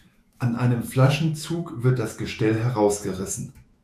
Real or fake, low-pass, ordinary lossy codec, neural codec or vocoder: fake; 14.4 kHz; AAC, 96 kbps; autoencoder, 48 kHz, 128 numbers a frame, DAC-VAE, trained on Japanese speech